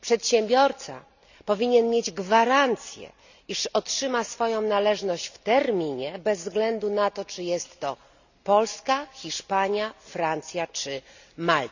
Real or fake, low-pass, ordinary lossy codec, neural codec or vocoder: real; 7.2 kHz; none; none